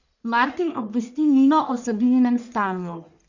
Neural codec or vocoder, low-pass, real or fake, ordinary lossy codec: codec, 44.1 kHz, 1.7 kbps, Pupu-Codec; 7.2 kHz; fake; none